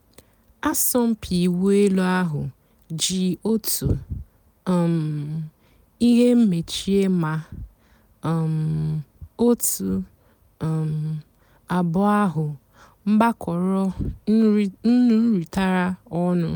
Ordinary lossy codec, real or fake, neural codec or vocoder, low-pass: none; real; none; none